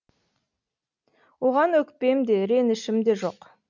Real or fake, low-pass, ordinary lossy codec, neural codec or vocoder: real; 7.2 kHz; none; none